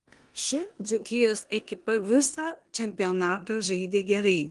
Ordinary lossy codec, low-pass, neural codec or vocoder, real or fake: Opus, 24 kbps; 10.8 kHz; codec, 16 kHz in and 24 kHz out, 0.9 kbps, LongCat-Audio-Codec, four codebook decoder; fake